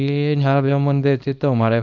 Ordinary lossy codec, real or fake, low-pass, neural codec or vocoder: none; fake; 7.2 kHz; codec, 24 kHz, 0.9 kbps, WavTokenizer, small release